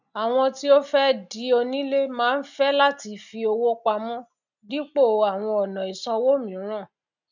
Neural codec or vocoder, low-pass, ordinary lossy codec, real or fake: none; 7.2 kHz; none; real